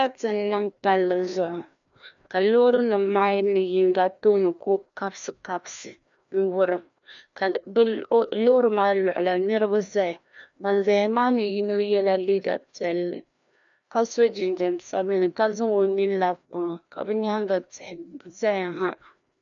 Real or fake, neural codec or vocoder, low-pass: fake; codec, 16 kHz, 1 kbps, FreqCodec, larger model; 7.2 kHz